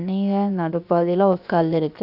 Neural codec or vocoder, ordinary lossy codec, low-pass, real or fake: codec, 16 kHz, 0.7 kbps, FocalCodec; none; 5.4 kHz; fake